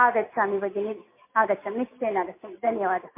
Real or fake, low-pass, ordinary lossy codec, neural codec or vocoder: real; 3.6 kHz; MP3, 24 kbps; none